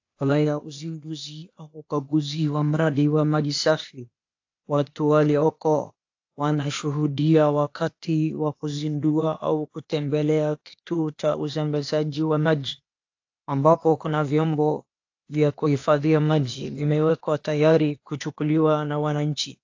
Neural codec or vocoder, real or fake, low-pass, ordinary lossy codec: codec, 16 kHz, 0.8 kbps, ZipCodec; fake; 7.2 kHz; AAC, 48 kbps